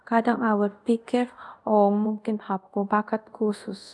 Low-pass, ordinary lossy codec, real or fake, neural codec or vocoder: none; none; fake; codec, 24 kHz, 0.5 kbps, DualCodec